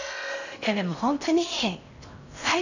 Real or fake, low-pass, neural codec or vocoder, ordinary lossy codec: fake; 7.2 kHz; codec, 16 kHz in and 24 kHz out, 0.6 kbps, FocalCodec, streaming, 4096 codes; none